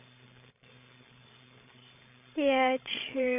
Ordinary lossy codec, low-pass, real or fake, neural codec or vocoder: none; 3.6 kHz; fake; codec, 16 kHz, 16 kbps, FreqCodec, larger model